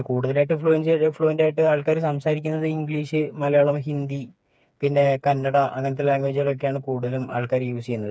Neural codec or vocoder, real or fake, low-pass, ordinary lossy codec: codec, 16 kHz, 4 kbps, FreqCodec, smaller model; fake; none; none